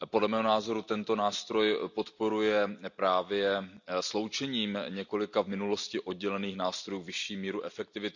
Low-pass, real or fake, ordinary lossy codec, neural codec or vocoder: 7.2 kHz; real; none; none